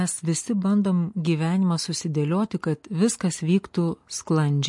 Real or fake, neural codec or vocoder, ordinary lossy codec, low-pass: real; none; MP3, 48 kbps; 10.8 kHz